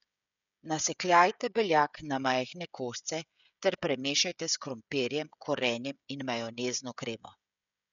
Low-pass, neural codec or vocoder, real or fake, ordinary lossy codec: 7.2 kHz; codec, 16 kHz, 16 kbps, FreqCodec, smaller model; fake; none